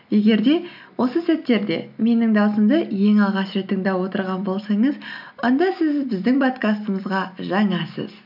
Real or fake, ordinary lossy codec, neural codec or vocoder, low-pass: real; none; none; 5.4 kHz